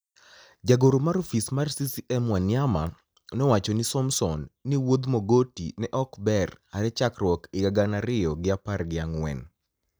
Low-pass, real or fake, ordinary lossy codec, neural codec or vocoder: none; real; none; none